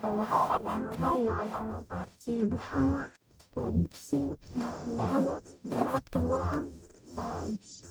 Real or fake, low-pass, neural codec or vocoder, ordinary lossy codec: fake; none; codec, 44.1 kHz, 0.9 kbps, DAC; none